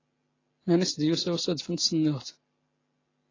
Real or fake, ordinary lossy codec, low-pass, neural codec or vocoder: real; AAC, 32 kbps; 7.2 kHz; none